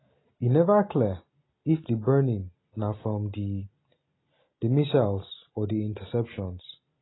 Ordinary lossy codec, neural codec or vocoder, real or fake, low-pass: AAC, 16 kbps; none; real; 7.2 kHz